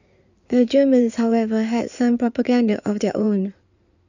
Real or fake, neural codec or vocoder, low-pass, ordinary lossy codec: fake; codec, 16 kHz in and 24 kHz out, 2.2 kbps, FireRedTTS-2 codec; 7.2 kHz; none